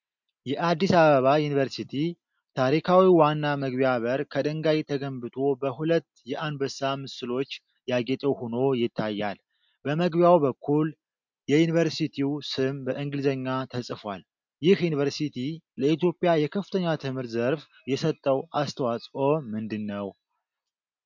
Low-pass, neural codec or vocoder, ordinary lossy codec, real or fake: 7.2 kHz; none; MP3, 64 kbps; real